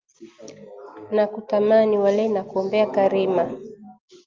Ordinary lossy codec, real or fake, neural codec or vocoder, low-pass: Opus, 24 kbps; real; none; 7.2 kHz